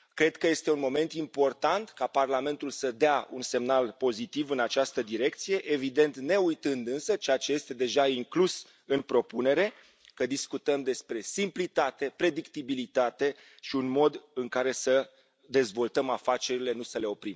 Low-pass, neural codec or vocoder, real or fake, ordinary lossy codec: none; none; real; none